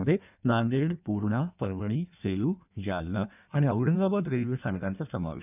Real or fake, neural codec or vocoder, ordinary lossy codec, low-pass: fake; codec, 16 kHz in and 24 kHz out, 1.1 kbps, FireRedTTS-2 codec; none; 3.6 kHz